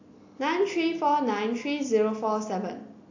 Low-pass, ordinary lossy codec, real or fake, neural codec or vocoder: 7.2 kHz; AAC, 48 kbps; real; none